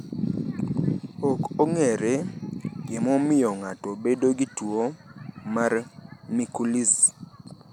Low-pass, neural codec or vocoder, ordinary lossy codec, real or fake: 19.8 kHz; none; none; real